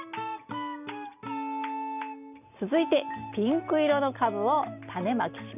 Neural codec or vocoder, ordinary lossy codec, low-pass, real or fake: none; none; 3.6 kHz; real